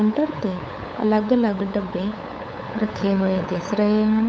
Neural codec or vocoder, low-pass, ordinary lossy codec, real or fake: codec, 16 kHz, 8 kbps, FunCodec, trained on LibriTTS, 25 frames a second; none; none; fake